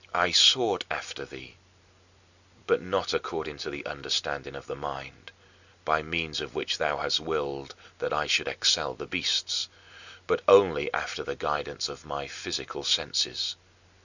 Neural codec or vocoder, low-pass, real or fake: none; 7.2 kHz; real